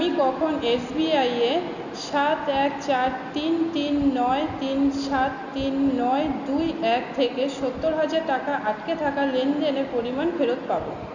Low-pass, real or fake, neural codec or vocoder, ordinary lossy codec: 7.2 kHz; real; none; none